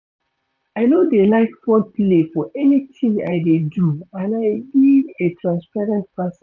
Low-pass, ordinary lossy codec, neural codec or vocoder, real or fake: 7.2 kHz; none; vocoder, 44.1 kHz, 128 mel bands, Pupu-Vocoder; fake